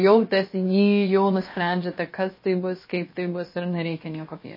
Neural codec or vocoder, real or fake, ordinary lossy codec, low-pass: codec, 16 kHz, about 1 kbps, DyCAST, with the encoder's durations; fake; MP3, 24 kbps; 5.4 kHz